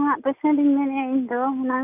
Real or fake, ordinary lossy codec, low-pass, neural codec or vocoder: real; none; 3.6 kHz; none